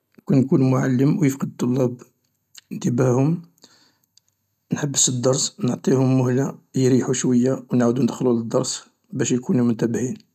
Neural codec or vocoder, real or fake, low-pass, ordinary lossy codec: none; real; 14.4 kHz; none